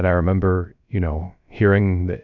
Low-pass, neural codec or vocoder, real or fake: 7.2 kHz; codec, 16 kHz, 0.3 kbps, FocalCodec; fake